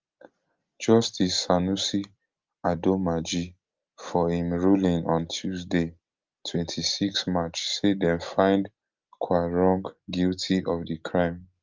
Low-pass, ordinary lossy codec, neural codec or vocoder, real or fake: 7.2 kHz; Opus, 24 kbps; none; real